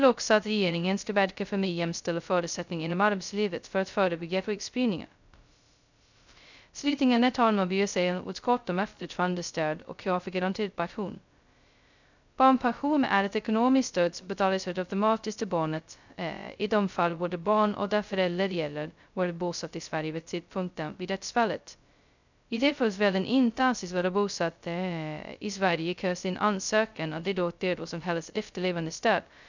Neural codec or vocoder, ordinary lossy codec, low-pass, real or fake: codec, 16 kHz, 0.2 kbps, FocalCodec; none; 7.2 kHz; fake